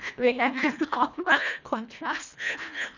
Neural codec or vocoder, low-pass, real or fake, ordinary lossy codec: codec, 24 kHz, 1.5 kbps, HILCodec; 7.2 kHz; fake; none